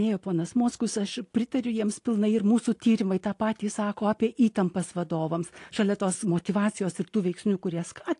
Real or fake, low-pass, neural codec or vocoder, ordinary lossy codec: real; 10.8 kHz; none; AAC, 48 kbps